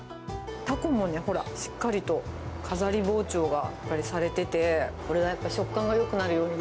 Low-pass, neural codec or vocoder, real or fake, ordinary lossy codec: none; none; real; none